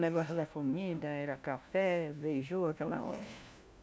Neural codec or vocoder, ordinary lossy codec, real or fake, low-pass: codec, 16 kHz, 1 kbps, FunCodec, trained on LibriTTS, 50 frames a second; none; fake; none